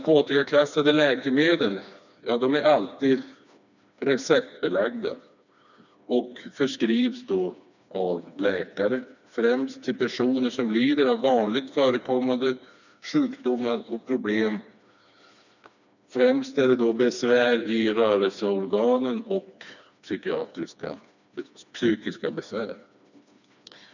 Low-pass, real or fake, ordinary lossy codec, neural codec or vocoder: 7.2 kHz; fake; none; codec, 16 kHz, 2 kbps, FreqCodec, smaller model